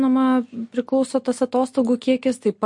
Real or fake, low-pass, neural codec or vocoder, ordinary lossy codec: real; 10.8 kHz; none; MP3, 48 kbps